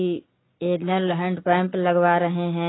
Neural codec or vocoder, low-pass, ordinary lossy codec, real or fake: none; 7.2 kHz; AAC, 16 kbps; real